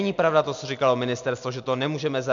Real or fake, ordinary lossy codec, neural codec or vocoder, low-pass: real; AAC, 64 kbps; none; 7.2 kHz